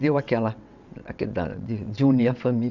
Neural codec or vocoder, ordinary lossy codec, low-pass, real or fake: vocoder, 22.05 kHz, 80 mel bands, WaveNeXt; none; 7.2 kHz; fake